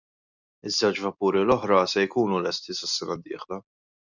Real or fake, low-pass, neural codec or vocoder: real; 7.2 kHz; none